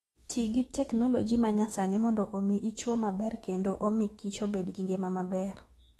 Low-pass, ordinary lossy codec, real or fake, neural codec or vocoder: 19.8 kHz; AAC, 32 kbps; fake; autoencoder, 48 kHz, 32 numbers a frame, DAC-VAE, trained on Japanese speech